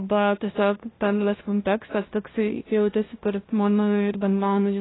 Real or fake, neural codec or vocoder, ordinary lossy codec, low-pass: fake; codec, 16 kHz, 0.5 kbps, FunCodec, trained on LibriTTS, 25 frames a second; AAC, 16 kbps; 7.2 kHz